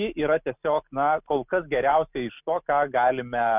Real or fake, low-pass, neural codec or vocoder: real; 3.6 kHz; none